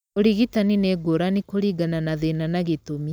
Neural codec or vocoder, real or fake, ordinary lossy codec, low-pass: none; real; none; none